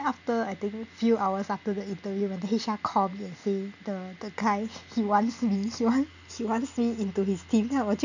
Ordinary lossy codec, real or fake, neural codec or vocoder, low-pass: none; real; none; 7.2 kHz